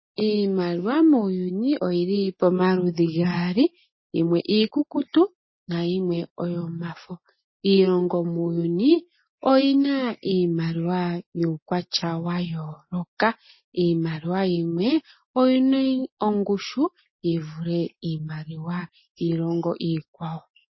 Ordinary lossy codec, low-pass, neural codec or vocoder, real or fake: MP3, 24 kbps; 7.2 kHz; none; real